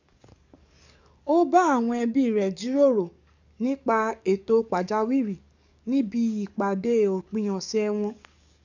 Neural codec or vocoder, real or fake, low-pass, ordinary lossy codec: codec, 44.1 kHz, 7.8 kbps, DAC; fake; 7.2 kHz; none